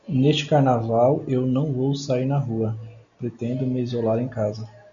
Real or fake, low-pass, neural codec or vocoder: real; 7.2 kHz; none